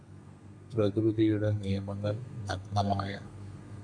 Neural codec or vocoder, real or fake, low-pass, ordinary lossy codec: codec, 32 kHz, 1.9 kbps, SNAC; fake; 9.9 kHz; MP3, 96 kbps